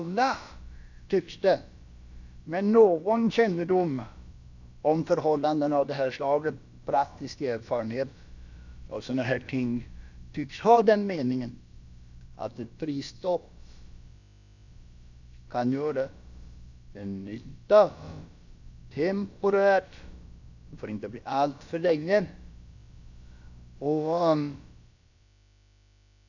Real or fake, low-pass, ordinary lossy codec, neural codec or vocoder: fake; 7.2 kHz; none; codec, 16 kHz, about 1 kbps, DyCAST, with the encoder's durations